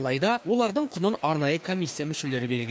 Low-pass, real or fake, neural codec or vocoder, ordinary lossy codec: none; fake; codec, 16 kHz, 2 kbps, FreqCodec, larger model; none